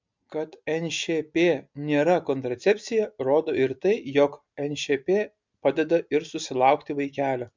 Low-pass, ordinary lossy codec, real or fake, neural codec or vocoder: 7.2 kHz; MP3, 64 kbps; real; none